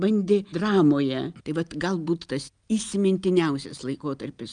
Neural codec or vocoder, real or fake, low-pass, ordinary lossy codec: none; real; 9.9 kHz; Opus, 32 kbps